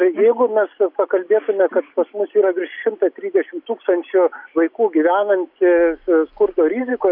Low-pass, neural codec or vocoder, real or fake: 5.4 kHz; none; real